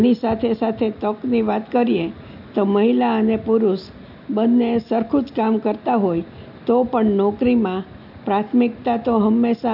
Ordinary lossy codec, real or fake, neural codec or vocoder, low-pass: AAC, 48 kbps; real; none; 5.4 kHz